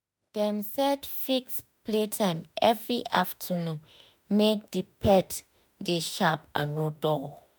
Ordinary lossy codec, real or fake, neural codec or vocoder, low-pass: none; fake; autoencoder, 48 kHz, 32 numbers a frame, DAC-VAE, trained on Japanese speech; none